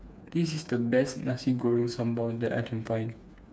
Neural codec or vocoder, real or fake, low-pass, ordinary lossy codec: codec, 16 kHz, 4 kbps, FreqCodec, smaller model; fake; none; none